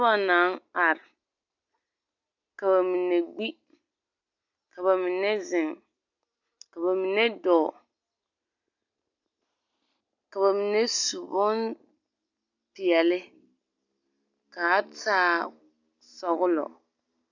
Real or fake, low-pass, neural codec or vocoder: real; 7.2 kHz; none